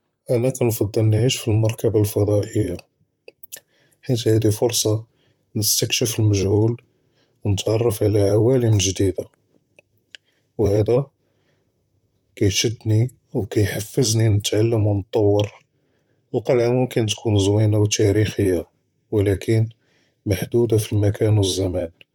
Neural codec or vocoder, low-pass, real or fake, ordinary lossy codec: vocoder, 44.1 kHz, 128 mel bands, Pupu-Vocoder; 19.8 kHz; fake; none